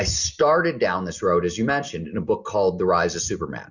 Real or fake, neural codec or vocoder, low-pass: real; none; 7.2 kHz